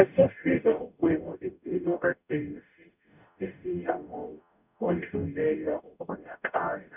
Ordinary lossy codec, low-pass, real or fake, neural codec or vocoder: none; 3.6 kHz; fake; codec, 44.1 kHz, 0.9 kbps, DAC